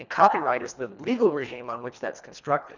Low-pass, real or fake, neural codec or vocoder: 7.2 kHz; fake; codec, 24 kHz, 1.5 kbps, HILCodec